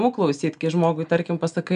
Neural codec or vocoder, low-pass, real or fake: none; 9.9 kHz; real